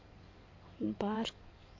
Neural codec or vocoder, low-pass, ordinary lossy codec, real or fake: codec, 16 kHz in and 24 kHz out, 2.2 kbps, FireRedTTS-2 codec; 7.2 kHz; MP3, 48 kbps; fake